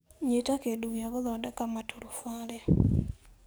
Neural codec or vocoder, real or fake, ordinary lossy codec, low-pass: codec, 44.1 kHz, 7.8 kbps, DAC; fake; none; none